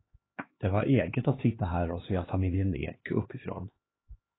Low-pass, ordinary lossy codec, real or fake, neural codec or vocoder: 7.2 kHz; AAC, 16 kbps; fake; codec, 16 kHz, 2 kbps, X-Codec, HuBERT features, trained on LibriSpeech